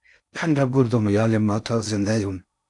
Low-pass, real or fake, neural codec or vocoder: 10.8 kHz; fake; codec, 16 kHz in and 24 kHz out, 0.6 kbps, FocalCodec, streaming, 4096 codes